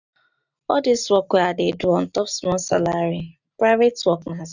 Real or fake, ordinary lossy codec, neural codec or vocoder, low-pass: real; none; none; 7.2 kHz